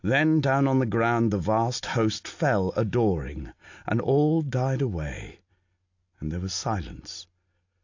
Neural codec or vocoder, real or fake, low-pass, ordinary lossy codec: none; real; 7.2 kHz; AAC, 48 kbps